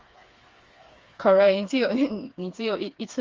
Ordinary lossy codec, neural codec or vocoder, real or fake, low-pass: Opus, 32 kbps; codec, 16 kHz, 4 kbps, FreqCodec, smaller model; fake; 7.2 kHz